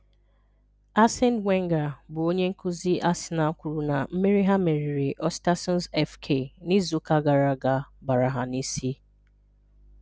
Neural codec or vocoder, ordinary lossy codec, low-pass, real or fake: none; none; none; real